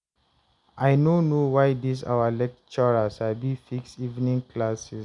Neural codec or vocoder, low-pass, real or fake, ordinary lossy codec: none; 10.8 kHz; real; none